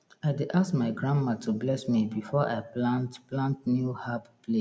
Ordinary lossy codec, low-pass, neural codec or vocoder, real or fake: none; none; none; real